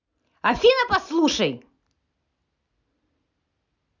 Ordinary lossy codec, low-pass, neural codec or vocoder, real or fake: none; 7.2 kHz; none; real